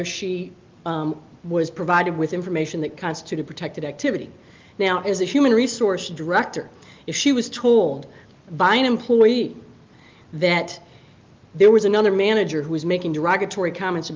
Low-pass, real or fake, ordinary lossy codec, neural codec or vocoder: 7.2 kHz; real; Opus, 32 kbps; none